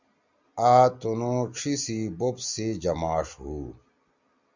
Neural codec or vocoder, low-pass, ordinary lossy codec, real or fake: none; 7.2 kHz; Opus, 64 kbps; real